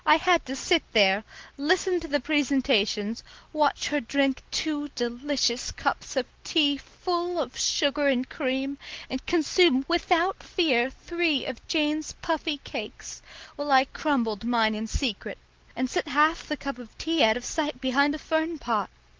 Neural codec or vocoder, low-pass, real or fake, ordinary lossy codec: none; 7.2 kHz; real; Opus, 16 kbps